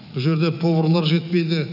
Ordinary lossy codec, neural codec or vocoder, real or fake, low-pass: none; autoencoder, 48 kHz, 128 numbers a frame, DAC-VAE, trained on Japanese speech; fake; 5.4 kHz